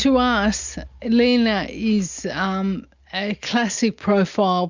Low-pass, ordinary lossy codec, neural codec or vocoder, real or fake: 7.2 kHz; Opus, 64 kbps; none; real